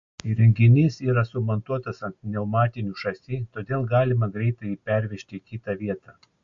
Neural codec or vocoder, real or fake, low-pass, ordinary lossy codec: none; real; 7.2 kHz; MP3, 48 kbps